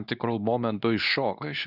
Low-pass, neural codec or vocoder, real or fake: 5.4 kHz; codec, 24 kHz, 0.9 kbps, WavTokenizer, medium speech release version 2; fake